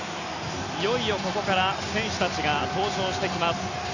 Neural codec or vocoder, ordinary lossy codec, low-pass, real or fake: none; none; 7.2 kHz; real